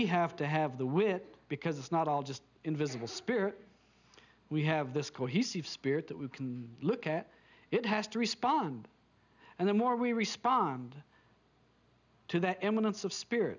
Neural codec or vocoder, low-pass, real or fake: none; 7.2 kHz; real